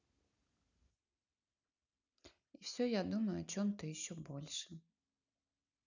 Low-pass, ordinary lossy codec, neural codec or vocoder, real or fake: 7.2 kHz; none; none; real